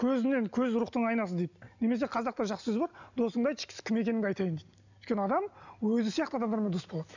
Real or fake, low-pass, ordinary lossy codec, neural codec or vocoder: real; 7.2 kHz; none; none